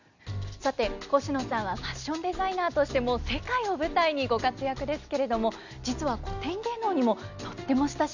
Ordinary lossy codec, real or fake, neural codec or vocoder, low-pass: none; real; none; 7.2 kHz